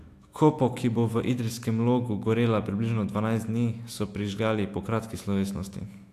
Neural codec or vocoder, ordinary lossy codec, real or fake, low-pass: autoencoder, 48 kHz, 128 numbers a frame, DAC-VAE, trained on Japanese speech; AAC, 64 kbps; fake; 14.4 kHz